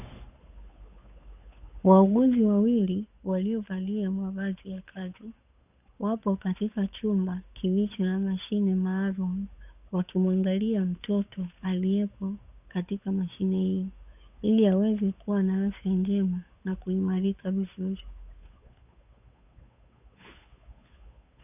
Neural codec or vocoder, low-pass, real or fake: codec, 16 kHz, 8 kbps, FunCodec, trained on Chinese and English, 25 frames a second; 3.6 kHz; fake